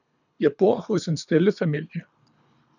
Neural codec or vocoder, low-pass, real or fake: codec, 24 kHz, 3 kbps, HILCodec; 7.2 kHz; fake